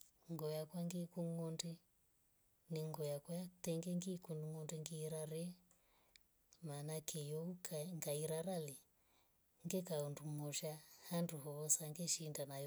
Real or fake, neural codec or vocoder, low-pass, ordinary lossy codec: real; none; none; none